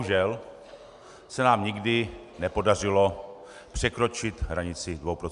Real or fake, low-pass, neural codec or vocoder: real; 10.8 kHz; none